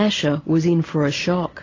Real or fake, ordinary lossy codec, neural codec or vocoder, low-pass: real; AAC, 32 kbps; none; 7.2 kHz